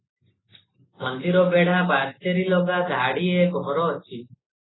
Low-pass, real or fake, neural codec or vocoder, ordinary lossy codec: 7.2 kHz; real; none; AAC, 16 kbps